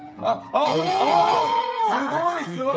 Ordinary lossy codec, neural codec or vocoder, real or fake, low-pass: none; codec, 16 kHz, 8 kbps, FreqCodec, smaller model; fake; none